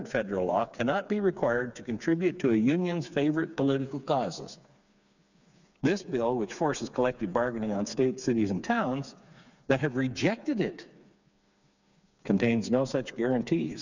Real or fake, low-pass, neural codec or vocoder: fake; 7.2 kHz; codec, 16 kHz, 4 kbps, FreqCodec, smaller model